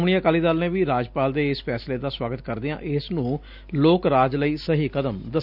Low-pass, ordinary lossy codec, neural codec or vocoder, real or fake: 5.4 kHz; none; none; real